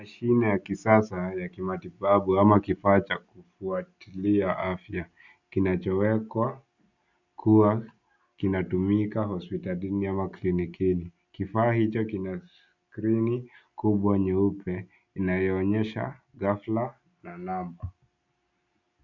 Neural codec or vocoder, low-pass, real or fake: none; 7.2 kHz; real